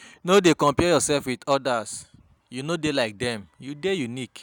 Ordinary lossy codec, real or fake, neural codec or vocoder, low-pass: none; real; none; none